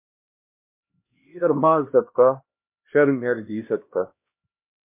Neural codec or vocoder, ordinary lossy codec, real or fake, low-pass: codec, 16 kHz, 1 kbps, X-Codec, HuBERT features, trained on LibriSpeech; MP3, 24 kbps; fake; 3.6 kHz